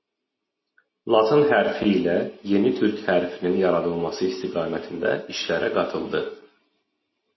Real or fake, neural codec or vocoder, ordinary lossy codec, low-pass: real; none; MP3, 24 kbps; 7.2 kHz